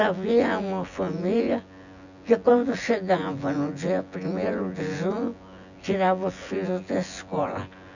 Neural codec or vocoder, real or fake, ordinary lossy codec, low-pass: vocoder, 24 kHz, 100 mel bands, Vocos; fake; none; 7.2 kHz